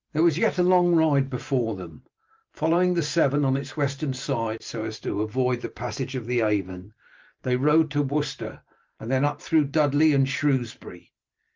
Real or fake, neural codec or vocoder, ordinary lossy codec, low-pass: fake; vocoder, 44.1 kHz, 128 mel bands every 512 samples, BigVGAN v2; Opus, 32 kbps; 7.2 kHz